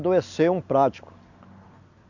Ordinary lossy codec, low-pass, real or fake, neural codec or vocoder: none; 7.2 kHz; real; none